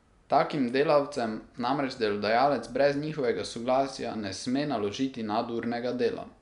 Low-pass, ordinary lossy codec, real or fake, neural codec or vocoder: 10.8 kHz; none; real; none